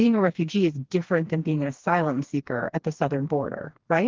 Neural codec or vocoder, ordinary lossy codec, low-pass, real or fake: codec, 16 kHz, 2 kbps, FreqCodec, smaller model; Opus, 16 kbps; 7.2 kHz; fake